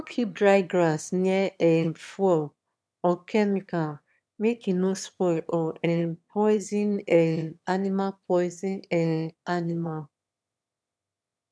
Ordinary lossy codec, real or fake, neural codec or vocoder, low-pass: none; fake; autoencoder, 22.05 kHz, a latent of 192 numbers a frame, VITS, trained on one speaker; none